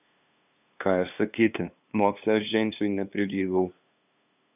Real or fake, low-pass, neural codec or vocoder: fake; 3.6 kHz; codec, 16 kHz, 2 kbps, FunCodec, trained on LibriTTS, 25 frames a second